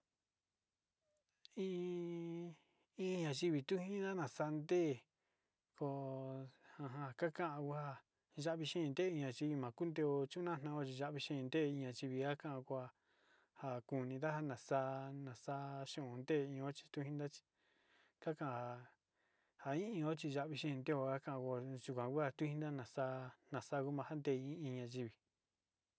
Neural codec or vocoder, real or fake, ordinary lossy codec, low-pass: none; real; none; none